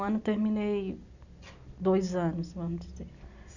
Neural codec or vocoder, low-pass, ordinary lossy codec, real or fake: none; 7.2 kHz; none; real